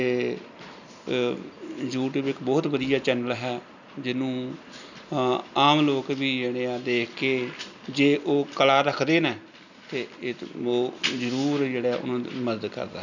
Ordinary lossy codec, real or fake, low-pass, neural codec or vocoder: none; real; 7.2 kHz; none